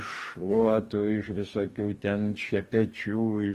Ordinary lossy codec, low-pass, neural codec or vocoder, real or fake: Opus, 24 kbps; 14.4 kHz; codec, 44.1 kHz, 2.6 kbps, DAC; fake